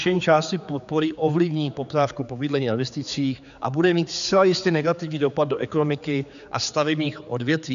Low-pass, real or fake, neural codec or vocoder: 7.2 kHz; fake; codec, 16 kHz, 4 kbps, X-Codec, HuBERT features, trained on general audio